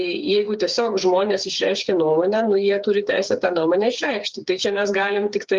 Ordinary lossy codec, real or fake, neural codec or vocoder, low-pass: Opus, 32 kbps; fake; codec, 16 kHz, 4 kbps, FreqCodec, smaller model; 7.2 kHz